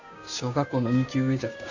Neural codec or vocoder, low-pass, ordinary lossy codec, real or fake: codec, 16 kHz, 6 kbps, DAC; 7.2 kHz; Opus, 64 kbps; fake